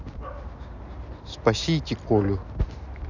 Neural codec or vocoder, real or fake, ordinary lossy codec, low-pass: none; real; none; 7.2 kHz